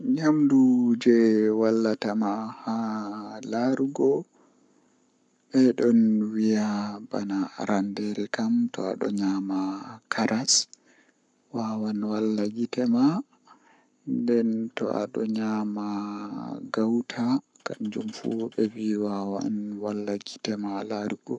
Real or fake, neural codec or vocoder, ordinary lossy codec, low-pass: fake; codec, 44.1 kHz, 7.8 kbps, Pupu-Codec; none; 10.8 kHz